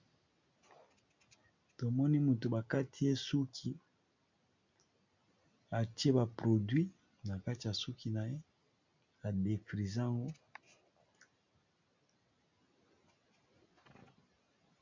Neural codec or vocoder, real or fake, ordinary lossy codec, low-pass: none; real; MP3, 64 kbps; 7.2 kHz